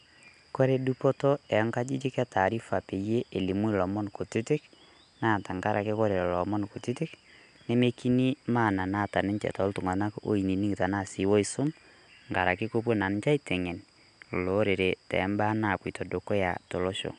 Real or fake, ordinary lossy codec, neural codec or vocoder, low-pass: real; MP3, 96 kbps; none; 10.8 kHz